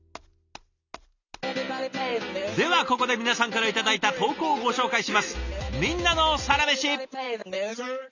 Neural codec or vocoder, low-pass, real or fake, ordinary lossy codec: none; 7.2 kHz; real; none